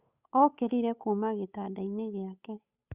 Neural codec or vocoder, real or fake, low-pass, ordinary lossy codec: codec, 16 kHz, 8 kbps, FunCodec, trained on Chinese and English, 25 frames a second; fake; 3.6 kHz; Opus, 64 kbps